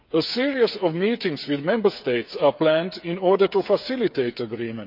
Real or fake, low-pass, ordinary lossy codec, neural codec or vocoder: fake; 5.4 kHz; none; codec, 16 kHz, 8 kbps, FreqCodec, smaller model